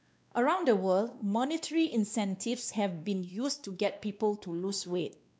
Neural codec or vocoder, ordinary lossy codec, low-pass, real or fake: codec, 16 kHz, 2 kbps, X-Codec, WavLM features, trained on Multilingual LibriSpeech; none; none; fake